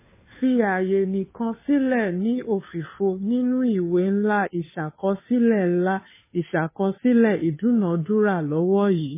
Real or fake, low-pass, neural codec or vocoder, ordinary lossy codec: fake; 3.6 kHz; codec, 16 kHz, 2 kbps, FunCodec, trained on Chinese and English, 25 frames a second; MP3, 16 kbps